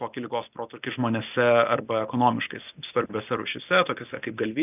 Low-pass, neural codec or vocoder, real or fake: 3.6 kHz; none; real